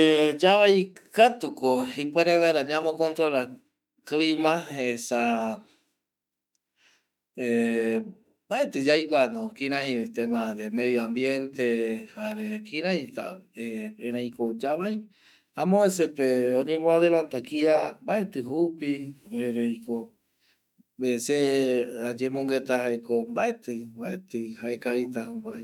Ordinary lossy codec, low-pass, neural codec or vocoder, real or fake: none; 19.8 kHz; autoencoder, 48 kHz, 32 numbers a frame, DAC-VAE, trained on Japanese speech; fake